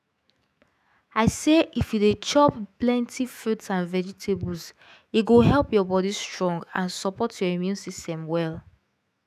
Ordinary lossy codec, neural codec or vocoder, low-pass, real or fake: none; autoencoder, 48 kHz, 128 numbers a frame, DAC-VAE, trained on Japanese speech; 14.4 kHz; fake